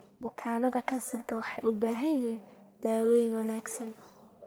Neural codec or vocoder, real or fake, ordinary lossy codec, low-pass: codec, 44.1 kHz, 1.7 kbps, Pupu-Codec; fake; none; none